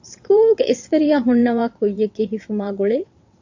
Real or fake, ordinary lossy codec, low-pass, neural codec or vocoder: fake; AAC, 48 kbps; 7.2 kHz; vocoder, 22.05 kHz, 80 mel bands, WaveNeXt